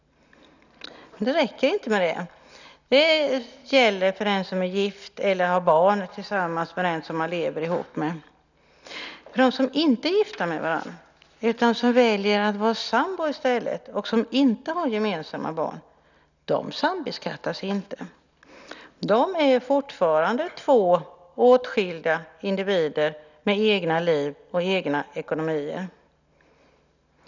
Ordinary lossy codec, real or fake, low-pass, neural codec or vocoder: none; real; 7.2 kHz; none